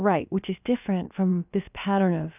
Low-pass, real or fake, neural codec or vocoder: 3.6 kHz; fake; codec, 16 kHz, about 1 kbps, DyCAST, with the encoder's durations